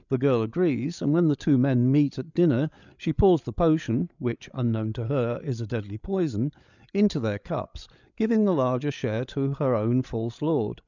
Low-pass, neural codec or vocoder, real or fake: 7.2 kHz; codec, 16 kHz, 8 kbps, FreqCodec, larger model; fake